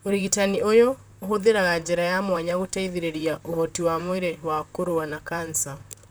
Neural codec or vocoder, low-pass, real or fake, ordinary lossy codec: vocoder, 44.1 kHz, 128 mel bands, Pupu-Vocoder; none; fake; none